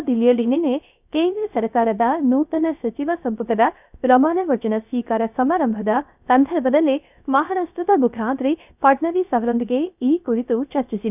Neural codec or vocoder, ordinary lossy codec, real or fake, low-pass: codec, 16 kHz, 0.3 kbps, FocalCodec; none; fake; 3.6 kHz